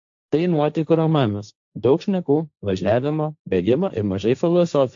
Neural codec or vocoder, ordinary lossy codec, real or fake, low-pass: codec, 16 kHz, 1.1 kbps, Voila-Tokenizer; AAC, 64 kbps; fake; 7.2 kHz